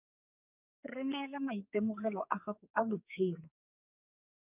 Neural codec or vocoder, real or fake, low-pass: codec, 44.1 kHz, 2.6 kbps, SNAC; fake; 3.6 kHz